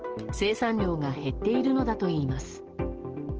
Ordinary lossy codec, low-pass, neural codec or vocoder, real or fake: Opus, 16 kbps; 7.2 kHz; none; real